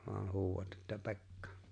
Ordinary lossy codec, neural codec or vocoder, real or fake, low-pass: MP3, 64 kbps; none; real; 9.9 kHz